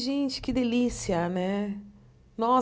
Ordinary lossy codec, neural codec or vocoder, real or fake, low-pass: none; none; real; none